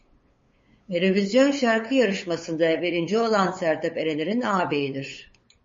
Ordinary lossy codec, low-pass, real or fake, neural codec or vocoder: MP3, 32 kbps; 7.2 kHz; fake; codec, 16 kHz, 8 kbps, FunCodec, trained on LibriTTS, 25 frames a second